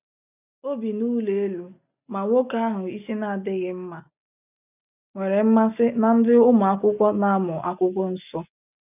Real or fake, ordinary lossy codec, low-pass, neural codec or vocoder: real; none; 3.6 kHz; none